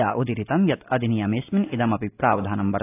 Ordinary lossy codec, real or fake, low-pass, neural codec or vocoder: AAC, 24 kbps; real; 3.6 kHz; none